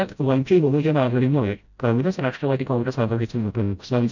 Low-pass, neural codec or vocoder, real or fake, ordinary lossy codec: 7.2 kHz; codec, 16 kHz, 0.5 kbps, FreqCodec, smaller model; fake; none